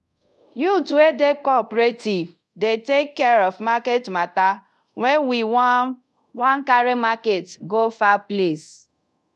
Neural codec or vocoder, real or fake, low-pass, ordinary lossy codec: codec, 24 kHz, 0.5 kbps, DualCodec; fake; none; none